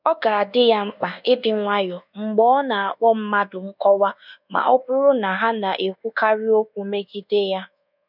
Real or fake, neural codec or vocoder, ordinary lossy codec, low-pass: fake; codec, 24 kHz, 1.2 kbps, DualCodec; none; 5.4 kHz